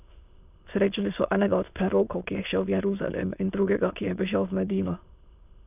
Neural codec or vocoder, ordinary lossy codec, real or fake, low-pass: autoencoder, 22.05 kHz, a latent of 192 numbers a frame, VITS, trained on many speakers; none; fake; 3.6 kHz